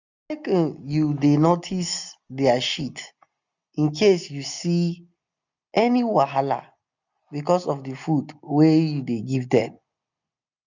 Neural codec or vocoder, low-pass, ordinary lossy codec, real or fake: none; 7.2 kHz; none; real